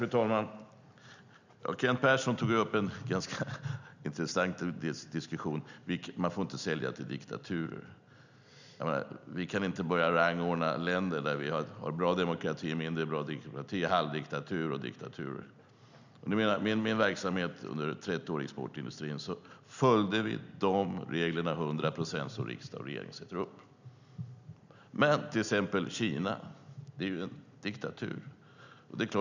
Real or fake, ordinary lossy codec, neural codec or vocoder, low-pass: real; none; none; 7.2 kHz